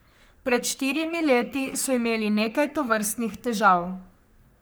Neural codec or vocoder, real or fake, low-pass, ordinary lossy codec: codec, 44.1 kHz, 3.4 kbps, Pupu-Codec; fake; none; none